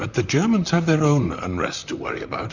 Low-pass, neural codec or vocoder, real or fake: 7.2 kHz; vocoder, 44.1 kHz, 128 mel bands, Pupu-Vocoder; fake